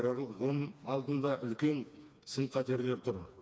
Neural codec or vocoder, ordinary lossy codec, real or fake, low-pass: codec, 16 kHz, 2 kbps, FreqCodec, smaller model; none; fake; none